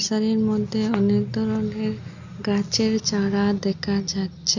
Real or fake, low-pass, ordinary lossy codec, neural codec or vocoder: real; 7.2 kHz; none; none